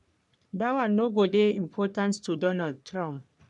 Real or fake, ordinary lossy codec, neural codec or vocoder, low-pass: fake; none; codec, 44.1 kHz, 3.4 kbps, Pupu-Codec; 10.8 kHz